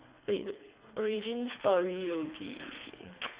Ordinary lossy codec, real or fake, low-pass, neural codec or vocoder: Opus, 64 kbps; fake; 3.6 kHz; codec, 24 kHz, 3 kbps, HILCodec